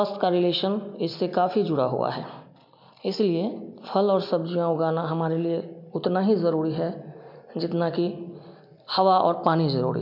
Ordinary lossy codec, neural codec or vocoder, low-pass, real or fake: MP3, 48 kbps; none; 5.4 kHz; real